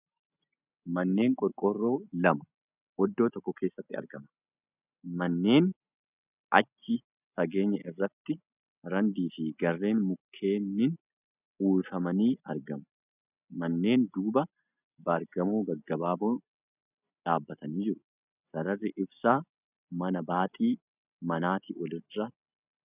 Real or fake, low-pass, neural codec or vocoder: real; 3.6 kHz; none